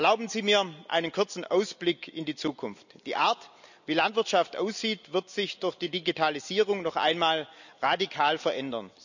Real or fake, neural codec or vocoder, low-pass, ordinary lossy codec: real; none; 7.2 kHz; none